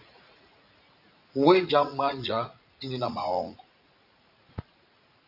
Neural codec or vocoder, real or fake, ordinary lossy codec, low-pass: vocoder, 44.1 kHz, 80 mel bands, Vocos; fake; AAC, 48 kbps; 5.4 kHz